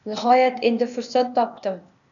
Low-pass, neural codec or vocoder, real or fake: 7.2 kHz; codec, 16 kHz, 0.8 kbps, ZipCodec; fake